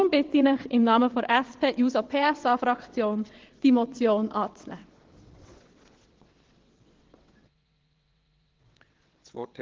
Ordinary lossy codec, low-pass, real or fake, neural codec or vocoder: Opus, 16 kbps; 7.2 kHz; fake; vocoder, 22.05 kHz, 80 mel bands, WaveNeXt